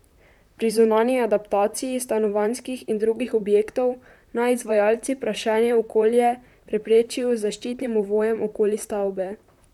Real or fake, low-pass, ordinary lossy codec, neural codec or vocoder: fake; 19.8 kHz; none; vocoder, 44.1 kHz, 128 mel bands, Pupu-Vocoder